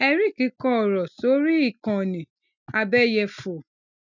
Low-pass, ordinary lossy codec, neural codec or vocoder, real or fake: 7.2 kHz; none; none; real